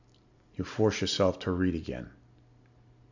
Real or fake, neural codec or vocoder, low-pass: real; none; 7.2 kHz